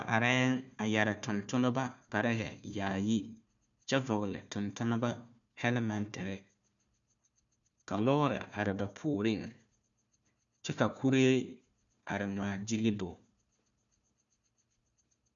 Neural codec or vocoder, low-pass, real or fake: codec, 16 kHz, 1 kbps, FunCodec, trained on Chinese and English, 50 frames a second; 7.2 kHz; fake